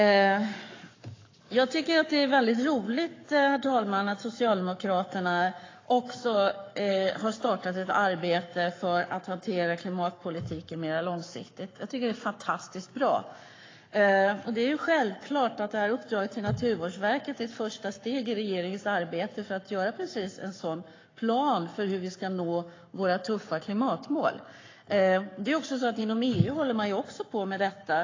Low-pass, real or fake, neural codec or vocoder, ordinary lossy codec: 7.2 kHz; fake; codec, 44.1 kHz, 7.8 kbps, Pupu-Codec; AAC, 32 kbps